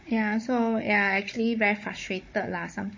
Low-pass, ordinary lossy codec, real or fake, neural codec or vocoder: 7.2 kHz; MP3, 32 kbps; fake; codec, 16 kHz, 16 kbps, FunCodec, trained on Chinese and English, 50 frames a second